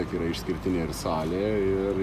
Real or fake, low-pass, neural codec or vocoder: real; 14.4 kHz; none